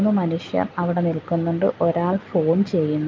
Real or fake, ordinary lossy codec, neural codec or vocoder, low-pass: real; Opus, 24 kbps; none; 7.2 kHz